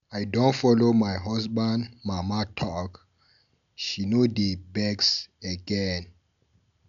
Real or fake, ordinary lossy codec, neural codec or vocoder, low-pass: real; none; none; 7.2 kHz